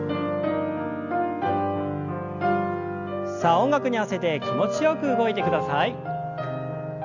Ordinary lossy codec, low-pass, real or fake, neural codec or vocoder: Opus, 64 kbps; 7.2 kHz; real; none